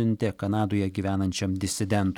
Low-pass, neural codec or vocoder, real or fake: 19.8 kHz; none; real